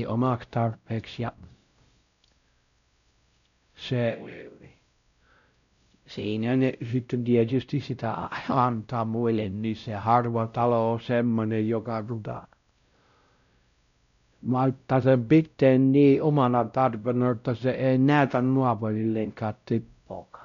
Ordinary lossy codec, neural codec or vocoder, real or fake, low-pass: none; codec, 16 kHz, 0.5 kbps, X-Codec, WavLM features, trained on Multilingual LibriSpeech; fake; 7.2 kHz